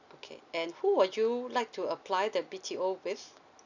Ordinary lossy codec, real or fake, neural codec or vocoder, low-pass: none; real; none; 7.2 kHz